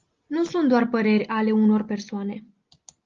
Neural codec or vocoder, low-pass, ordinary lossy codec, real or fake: none; 7.2 kHz; Opus, 32 kbps; real